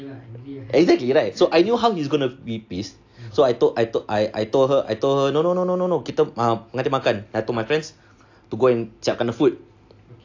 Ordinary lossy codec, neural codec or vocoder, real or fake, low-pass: AAC, 48 kbps; none; real; 7.2 kHz